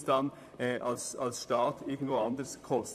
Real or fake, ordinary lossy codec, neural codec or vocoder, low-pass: fake; none; vocoder, 44.1 kHz, 128 mel bands, Pupu-Vocoder; 14.4 kHz